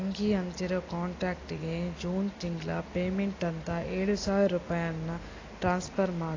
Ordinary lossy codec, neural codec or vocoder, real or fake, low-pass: AAC, 32 kbps; none; real; 7.2 kHz